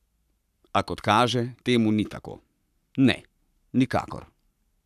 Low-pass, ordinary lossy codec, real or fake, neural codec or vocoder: 14.4 kHz; none; fake; codec, 44.1 kHz, 7.8 kbps, Pupu-Codec